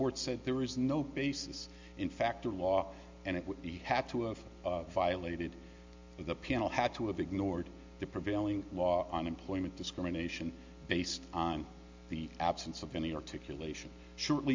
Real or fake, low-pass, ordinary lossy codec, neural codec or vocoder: real; 7.2 kHz; MP3, 64 kbps; none